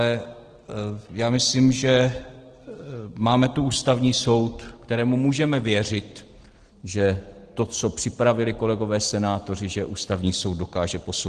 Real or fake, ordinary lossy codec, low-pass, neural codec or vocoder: real; Opus, 16 kbps; 9.9 kHz; none